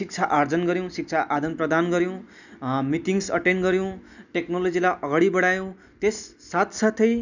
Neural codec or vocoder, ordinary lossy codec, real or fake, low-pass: none; none; real; 7.2 kHz